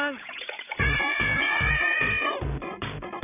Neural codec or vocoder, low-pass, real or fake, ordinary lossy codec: codec, 16 kHz, 16 kbps, FreqCodec, larger model; 3.6 kHz; fake; none